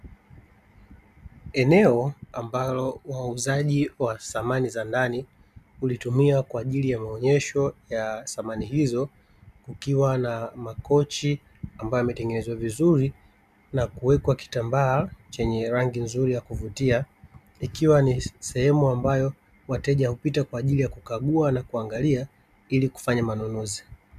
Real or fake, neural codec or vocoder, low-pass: real; none; 14.4 kHz